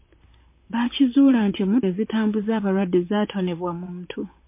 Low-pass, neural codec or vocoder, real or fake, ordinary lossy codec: 3.6 kHz; vocoder, 44.1 kHz, 128 mel bands, Pupu-Vocoder; fake; MP3, 24 kbps